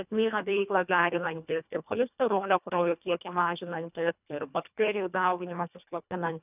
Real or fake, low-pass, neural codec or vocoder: fake; 3.6 kHz; codec, 24 kHz, 1.5 kbps, HILCodec